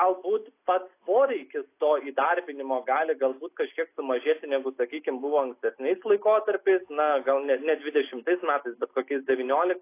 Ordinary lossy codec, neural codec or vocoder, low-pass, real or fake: AAC, 24 kbps; none; 3.6 kHz; real